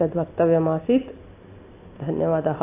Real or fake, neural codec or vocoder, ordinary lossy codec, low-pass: real; none; AAC, 24 kbps; 3.6 kHz